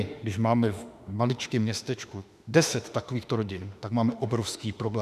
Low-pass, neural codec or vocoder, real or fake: 14.4 kHz; autoencoder, 48 kHz, 32 numbers a frame, DAC-VAE, trained on Japanese speech; fake